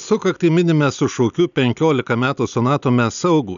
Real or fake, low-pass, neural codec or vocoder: real; 7.2 kHz; none